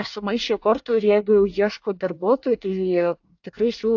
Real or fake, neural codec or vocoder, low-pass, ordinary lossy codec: fake; codec, 24 kHz, 1 kbps, SNAC; 7.2 kHz; AAC, 48 kbps